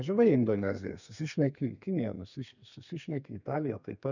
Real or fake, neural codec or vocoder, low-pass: fake; codec, 32 kHz, 1.9 kbps, SNAC; 7.2 kHz